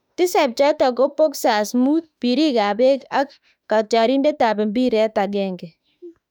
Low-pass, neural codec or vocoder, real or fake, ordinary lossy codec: 19.8 kHz; autoencoder, 48 kHz, 32 numbers a frame, DAC-VAE, trained on Japanese speech; fake; none